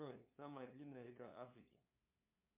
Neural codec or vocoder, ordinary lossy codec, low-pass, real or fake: codec, 16 kHz, 2 kbps, FunCodec, trained on LibriTTS, 25 frames a second; MP3, 32 kbps; 3.6 kHz; fake